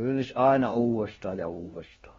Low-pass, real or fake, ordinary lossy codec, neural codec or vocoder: 7.2 kHz; fake; AAC, 24 kbps; codec, 16 kHz, 1 kbps, FunCodec, trained on LibriTTS, 50 frames a second